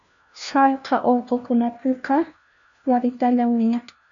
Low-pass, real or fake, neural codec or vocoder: 7.2 kHz; fake; codec, 16 kHz, 1 kbps, FunCodec, trained on LibriTTS, 50 frames a second